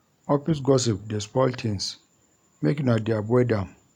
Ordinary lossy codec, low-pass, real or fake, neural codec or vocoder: none; 19.8 kHz; real; none